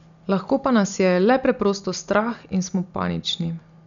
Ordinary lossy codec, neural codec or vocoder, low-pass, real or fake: none; none; 7.2 kHz; real